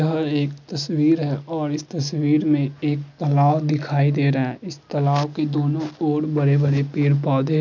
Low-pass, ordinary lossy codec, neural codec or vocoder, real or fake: 7.2 kHz; none; none; real